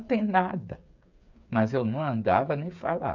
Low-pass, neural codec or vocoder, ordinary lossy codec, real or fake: 7.2 kHz; codec, 16 kHz, 8 kbps, FreqCodec, smaller model; none; fake